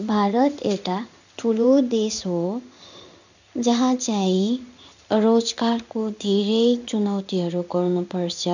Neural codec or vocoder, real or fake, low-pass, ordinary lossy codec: codec, 16 kHz in and 24 kHz out, 1 kbps, XY-Tokenizer; fake; 7.2 kHz; none